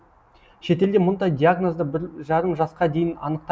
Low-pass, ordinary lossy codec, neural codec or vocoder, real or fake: none; none; none; real